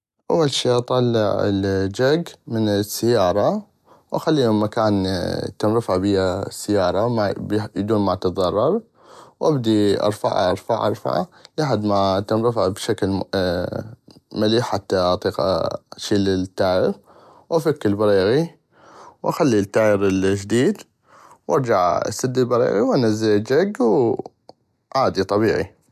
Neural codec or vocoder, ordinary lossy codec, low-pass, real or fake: none; none; 14.4 kHz; real